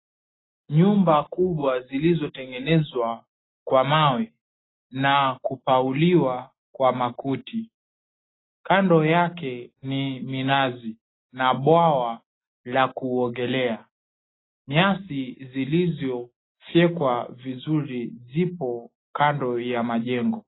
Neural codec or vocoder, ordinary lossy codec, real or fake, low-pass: none; AAC, 16 kbps; real; 7.2 kHz